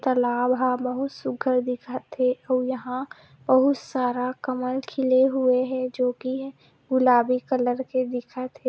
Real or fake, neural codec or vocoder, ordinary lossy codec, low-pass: real; none; none; none